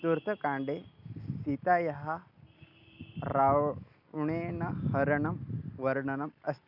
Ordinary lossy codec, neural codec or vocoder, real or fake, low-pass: none; none; real; 5.4 kHz